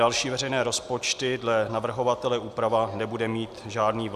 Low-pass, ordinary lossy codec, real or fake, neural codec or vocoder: 14.4 kHz; Opus, 64 kbps; real; none